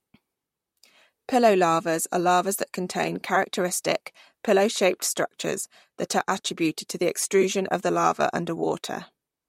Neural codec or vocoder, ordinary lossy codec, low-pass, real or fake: vocoder, 44.1 kHz, 128 mel bands, Pupu-Vocoder; MP3, 64 kbps; 19.8 kHz; fake